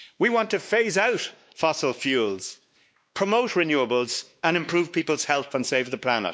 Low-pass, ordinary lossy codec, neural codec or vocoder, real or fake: none; none; codec, 16 kHz, 2 kbps, X-Codec, WavLM features, trained on Multilingual LibriSpeech; fake